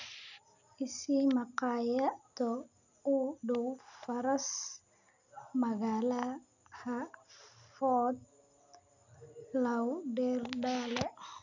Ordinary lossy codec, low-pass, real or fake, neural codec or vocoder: none; 7.2 kHz; real; none